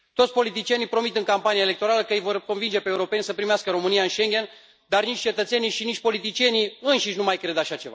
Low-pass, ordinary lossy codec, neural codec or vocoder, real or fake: none; none; none; real